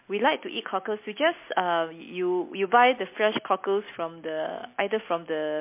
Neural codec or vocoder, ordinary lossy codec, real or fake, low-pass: none; MP3, 24 kbps; real; 3.6 kHz